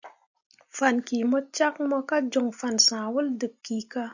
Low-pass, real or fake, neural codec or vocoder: 7.2 kHz; real; none